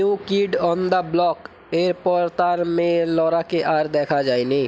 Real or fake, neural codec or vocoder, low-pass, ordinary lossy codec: real; none; none; none